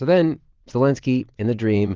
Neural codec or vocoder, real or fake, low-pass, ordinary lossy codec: vocoder, 22.05 kHz, 80 mel bands, WaveNeXt; fake; 7.2 kHz; Opus, 32 kbps